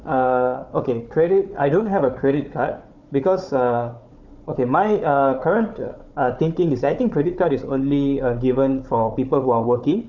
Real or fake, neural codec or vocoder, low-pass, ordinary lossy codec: fake; codec, 16 kHz, 4 kbps, FunCodec, trained on Chinese and English, 50 frames a second; 7.2 kHz; none